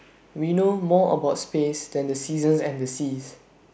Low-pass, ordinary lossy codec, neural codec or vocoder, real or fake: none; none; none; real